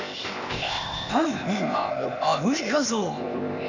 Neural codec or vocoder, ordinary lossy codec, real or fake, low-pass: codec, 16 kHz, 0.8 kbps, ZipCodec; none; fake; 7.2 kHz